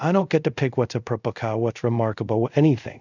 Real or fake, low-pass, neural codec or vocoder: fake; 7.2 kHz; codec, 24 kHz, 0.5 kbps, DualCodec